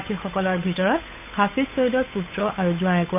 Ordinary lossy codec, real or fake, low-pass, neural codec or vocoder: none; fake; 3.6 kHz; codec, 16 kHz, 8 kbps, FunCodec, trained on Chinese and English, 25 frames a second